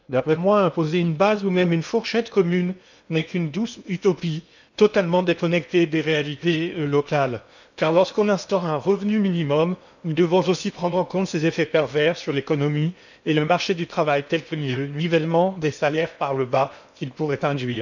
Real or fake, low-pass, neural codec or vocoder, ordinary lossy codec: fake; 7.2 kHz; codec, 16 kHz in and 24 kHz out, 0.8 kbps, FocalCodec, streaming, 65536 codes; none